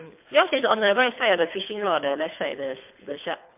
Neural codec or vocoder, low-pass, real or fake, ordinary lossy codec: codec, 24 kHz, 3 kbps, HILCodec; 3.6 kHz; fake; MP3, 32 kbps